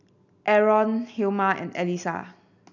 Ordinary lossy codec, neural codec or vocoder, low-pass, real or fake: none; none; 7.2 kHz; real